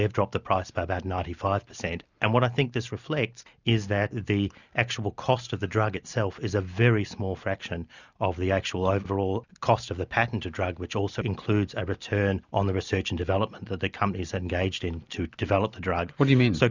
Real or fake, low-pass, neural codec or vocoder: real; 7.2 kHz; none